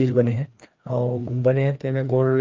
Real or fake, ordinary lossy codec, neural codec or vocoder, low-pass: fake; Opus, 24 kbps; codec, 16 kHz, 2 kbps, FreqCodec, larger model; 7.2 kHz